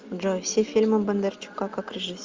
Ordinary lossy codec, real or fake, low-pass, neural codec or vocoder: Opus, 32 kbps; real; 7.2 kHz; none